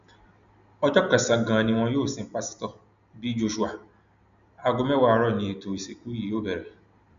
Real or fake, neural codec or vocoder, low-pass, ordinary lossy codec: real; none; 7.2 kHz; none